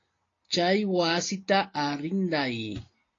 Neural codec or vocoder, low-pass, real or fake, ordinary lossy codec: none; 7.2 kHz; real; AAC, 32 kbps